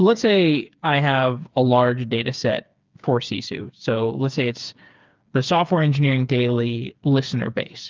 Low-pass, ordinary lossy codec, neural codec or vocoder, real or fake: 7.2 kHz; Opus, 24 kbps; codec, 16 kHz, 4 kbps, FreqCodec, smaller model; fake